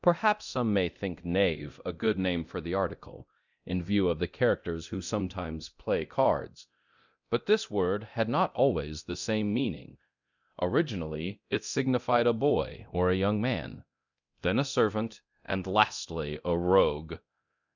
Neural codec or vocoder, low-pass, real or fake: codec, 24 kHz, 0.9 kbps, DualCodec; 7.2 kHz; fake